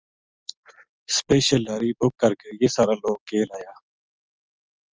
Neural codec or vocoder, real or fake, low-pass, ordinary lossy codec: none; real; 7.2 kHz; Opus, 24 kbps